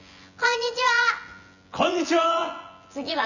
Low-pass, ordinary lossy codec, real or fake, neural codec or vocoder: 7.2 kHz; none; fake; vocoder, 24 kHz, 100 mel bands, Vocos